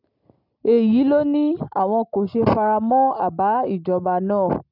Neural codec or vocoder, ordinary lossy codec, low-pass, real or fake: none; none; 5.4 kHz; real